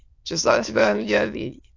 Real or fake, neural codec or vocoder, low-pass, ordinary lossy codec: fake; autoencoder, 22.05 kHz, a latent of 192 numbers a frame, VITS, trained on many speakers; 7.2 kHz; AAC, 48 kbps